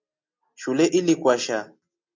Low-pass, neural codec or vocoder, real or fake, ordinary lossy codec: 7.2 kHz; none; real; MP3, 48 kbps